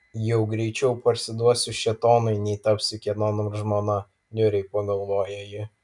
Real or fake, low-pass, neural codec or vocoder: real; 10.8 kHz; none